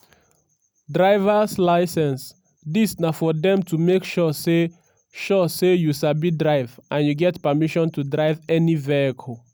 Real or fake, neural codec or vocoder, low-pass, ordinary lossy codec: real; none; none; none